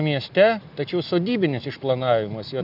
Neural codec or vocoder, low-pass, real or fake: none; 5.4 kHz; real